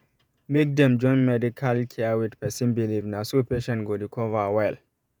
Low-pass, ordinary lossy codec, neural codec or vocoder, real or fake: none; none; vocoder, 48 kHz, 128 mel bands, Vocos; fake